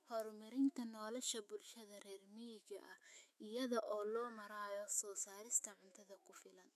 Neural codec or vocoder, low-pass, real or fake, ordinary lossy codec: autoencoder, 48 kHz, 128 numbers a frame, DAC-VAE, trained on Japanese speech; 14.4 kHz; fake; none